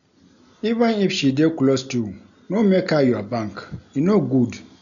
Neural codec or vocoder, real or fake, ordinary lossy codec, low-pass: none; real; none; 7.2 kHz